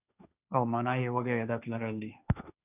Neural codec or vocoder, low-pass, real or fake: codec, 16 kHz, 1.1 kbps, Voila-Tokenizer; 3.6 kHz; fake